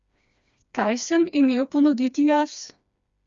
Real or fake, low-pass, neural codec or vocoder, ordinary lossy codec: fake; 7.2 kHz; codec, 16 kHz, 2 kbps, FreqCodec, smaller model; Opus, 64 kbps